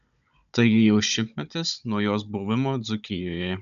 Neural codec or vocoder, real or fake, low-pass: codec, 16 kHz, 16 kbps, FunCodec, trained on Chinese and English, 50 frames a second; fake; 7.2 kHz